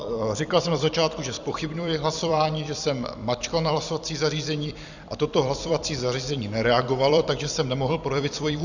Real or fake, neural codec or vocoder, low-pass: fake; vocoder, 44.1 kHz, 128 mel bands every 256 samples, BigVGAN v2; 7.2 kHz